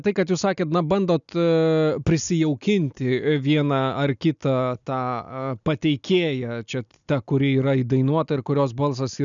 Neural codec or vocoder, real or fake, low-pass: none; real; 7.2 kHz